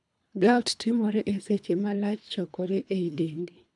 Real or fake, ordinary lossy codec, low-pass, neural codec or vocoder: fake; none; 10.8 kHz; codec, 24 kHz, 3 kbps, HILCodec